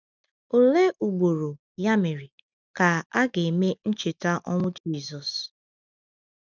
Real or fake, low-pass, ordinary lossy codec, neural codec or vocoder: real; 7.2 kHz; none; none